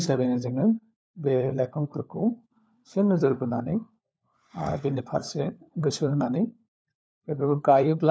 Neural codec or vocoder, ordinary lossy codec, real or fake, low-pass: codec, 16 kHz, 4 kbps, FunCodec, trained on LibriTTS, 50 frames a second; none; fake; none